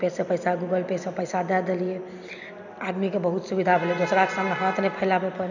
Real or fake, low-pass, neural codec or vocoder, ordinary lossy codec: real; 7.2 kHz; none; none